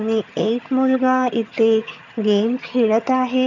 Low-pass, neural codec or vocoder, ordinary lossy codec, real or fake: 7.2 kHz; vocoder, 22.05 kHz, 80 mel bands, HiFi-GAN; none; fake